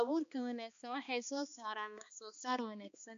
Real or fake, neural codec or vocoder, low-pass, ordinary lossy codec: fake; codec, 16 kHz, 2 kbps, X-Codec, HuBERT features, trained on balanced general audio; 7.2 kHz; none